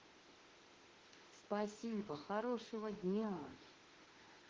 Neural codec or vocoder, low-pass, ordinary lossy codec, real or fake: autoencoder, 48 kHz, 32 numbers a frame, DAC-VAE, trained on Japanese speech; 7.2 kHz; Opus, 16 kbps; fake